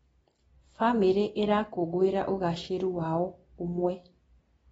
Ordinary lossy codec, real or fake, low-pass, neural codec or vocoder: AAC, 24 kbps; real; 19.8 kHz; none